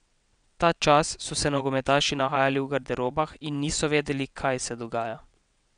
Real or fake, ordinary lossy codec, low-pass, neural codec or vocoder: fake; none; 9.9 kHz; vocoder, 22.05 kHz, 80 mel bands, WaveNeXt